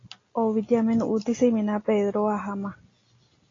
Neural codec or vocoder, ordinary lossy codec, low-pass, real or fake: none; AAC, 32 kbps; 7.2 kHz; real